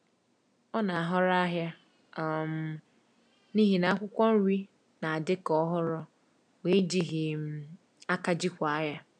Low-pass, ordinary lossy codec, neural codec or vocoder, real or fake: 9.9 kHz; none; none; real